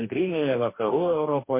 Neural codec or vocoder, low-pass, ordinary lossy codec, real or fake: codec, 44.1 kHz, 2.6 kbps, DAC; 3.6 kHz; MP3, 24 kbps; fake